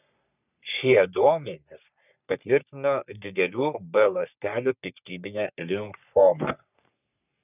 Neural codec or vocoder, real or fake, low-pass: codec, 44.1 kHz, 3.4 kbps, Pupu-Codec; fake; 3.6 kHz